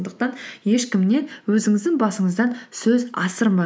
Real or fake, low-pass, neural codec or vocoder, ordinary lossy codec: real; none; none; none